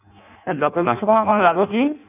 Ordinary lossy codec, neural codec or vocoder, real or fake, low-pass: none; codec, 16 kHz in and 24 kHz out, 0.6 kbps, FireRedTTS-2 codec; fake; 3.6 kHz